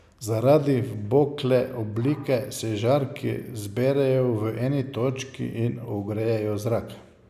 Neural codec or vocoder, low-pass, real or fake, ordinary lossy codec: none; 14.4 kHz; real; none